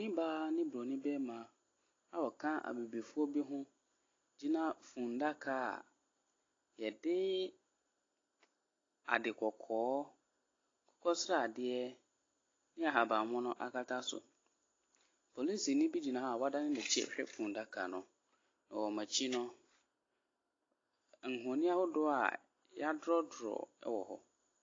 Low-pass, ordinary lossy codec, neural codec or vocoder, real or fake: 7.2 kHz; AAC, 32 kbps; none; real